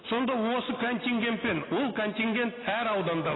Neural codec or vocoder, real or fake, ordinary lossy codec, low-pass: none; real; AAC, 16 kbps; 7.2 kHz